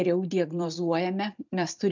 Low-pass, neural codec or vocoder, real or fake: 7.2 kHz; vocoder, 44.1 kHz, 128 mel bands every 256 samples, BigVGAN v2; fake